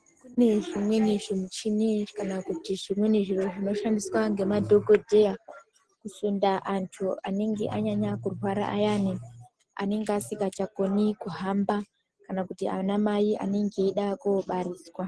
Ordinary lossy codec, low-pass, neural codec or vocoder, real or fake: Opus, 16 kbps; 10.8 kHz; none; real